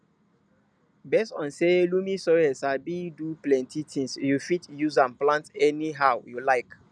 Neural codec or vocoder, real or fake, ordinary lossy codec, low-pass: none; real; none; 9.9 kHz